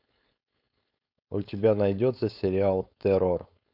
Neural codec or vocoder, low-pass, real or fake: codec, 16 kHz, 4.8 kbps, FACodec; 5.4 kHz; fake